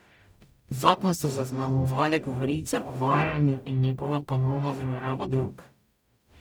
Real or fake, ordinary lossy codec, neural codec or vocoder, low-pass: fake; none; codec, 44.1 kHz, 0.9 kbps, DAC; none